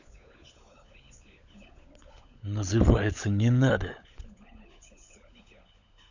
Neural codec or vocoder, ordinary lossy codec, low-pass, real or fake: codec, 16 kHz, 16 kbps, FunCodec, trained on LibriTTS, 50 frames a second; none; 7.2 kHz; fake